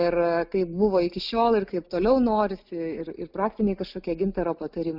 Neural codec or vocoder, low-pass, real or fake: none; 5.4 kHz; real